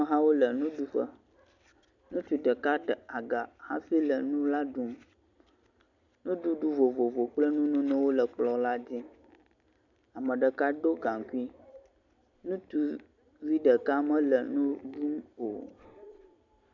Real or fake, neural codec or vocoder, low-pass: real; none; 7.2 kHz